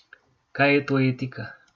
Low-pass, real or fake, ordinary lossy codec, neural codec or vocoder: none; real; none; none